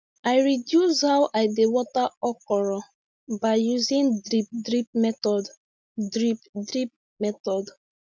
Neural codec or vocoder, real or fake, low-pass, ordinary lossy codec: none; real; none; none